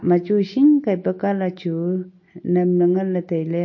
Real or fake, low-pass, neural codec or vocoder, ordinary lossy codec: real; 7.2 kHz; none; MP3, 32 kbps